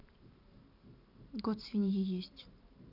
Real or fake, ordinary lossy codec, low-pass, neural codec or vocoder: fake; none; 5.4 kHz; vocoder, 44.1 kHz, 128 mel bands every 512 samples, BigVGAN v2